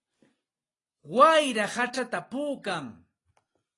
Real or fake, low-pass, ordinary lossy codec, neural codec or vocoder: real; 10.8 kHz; AAC, 32 kbps; none